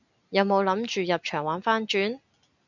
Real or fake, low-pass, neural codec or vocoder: real; 7.2 kHz; none